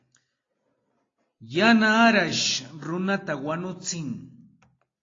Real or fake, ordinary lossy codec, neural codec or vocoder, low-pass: real; AAC, 32 kbps; none; 7.2 kHz